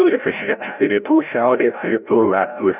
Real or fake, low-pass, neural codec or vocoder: fake; 3.6 kHz; codec, 16 kHz, 0.5 kbps, FreqCodec, larger model